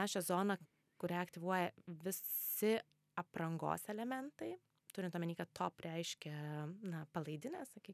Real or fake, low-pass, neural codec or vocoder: real; 14.4 kHz; none